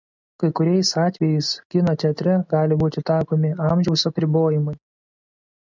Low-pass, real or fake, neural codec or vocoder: 7.2 kHz; real; none